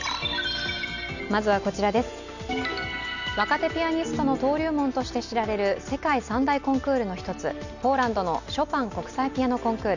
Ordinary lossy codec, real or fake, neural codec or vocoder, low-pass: none; real; none; 7.2 kHz